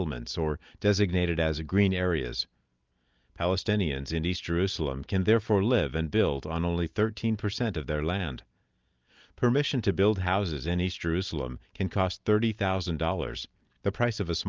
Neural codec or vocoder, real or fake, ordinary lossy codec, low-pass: none; real; Opus, 24 kbps; 7.2 kHz